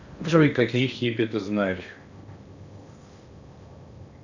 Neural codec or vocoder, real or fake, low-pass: codec, 16 kHz in and 24 kHz out, 0.8 kbps, FocalCodec, streaming, 65536 codes; fake; 7.2 kHz